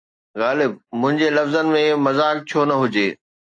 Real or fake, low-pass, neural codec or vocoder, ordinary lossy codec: real; 9.9 kHz; none; AAC, 48 kbps